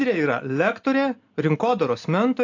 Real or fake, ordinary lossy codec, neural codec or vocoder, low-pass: real; AAC, 48 kbps; none; 7.2 kHz